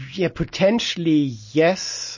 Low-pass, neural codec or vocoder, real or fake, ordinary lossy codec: 7.2 kHz; none; real; MP3, 32 kbps